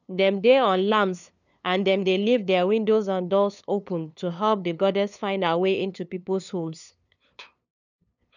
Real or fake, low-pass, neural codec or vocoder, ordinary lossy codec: fake; 7.2 kHz; codec, 16 kHz, 2 kbps, FunCodec, trained on LibriTTS, 25 frames a second; none